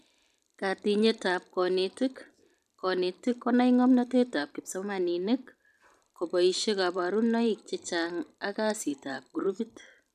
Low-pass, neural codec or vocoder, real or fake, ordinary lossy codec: 14.4 kHz; vocoder, 44.1 kHz, 128 mel bands every 512 samples, BigVGAN v2; fake; none